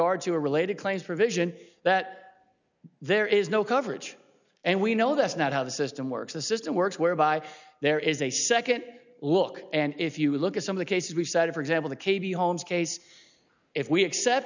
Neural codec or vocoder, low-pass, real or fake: none; 7.2 kHz; real